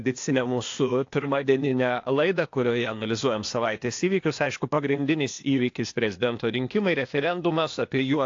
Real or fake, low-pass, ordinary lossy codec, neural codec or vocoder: fake; 7.2 kHz; AAC, 48 kbps; codec, 16 kHz, 0.8 kbps, ZipCodec